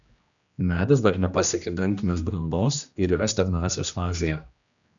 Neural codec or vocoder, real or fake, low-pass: codec, 16 kHz, 1 kbps, X-Codec, HuBERT features, trained on general audio; fake; 7.2 kHz